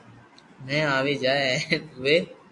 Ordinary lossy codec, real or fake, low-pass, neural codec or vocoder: MP3, 64 kbps; real; 10.8 kHz; none